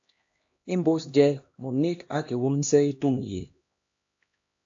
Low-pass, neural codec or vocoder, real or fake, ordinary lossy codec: 7.2 kHz; codec, 16 kHz, 1 kbps, X-Codec, HuBERT features, trained on LibriSpeech; fake; AAC, 48 kbps